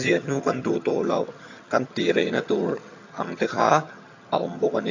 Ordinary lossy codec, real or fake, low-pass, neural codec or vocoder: AAC, 48 kbps; fake; 7.2 kHz; vocoder, 22.05 kHz, 80 mel bands, HiFi-GAN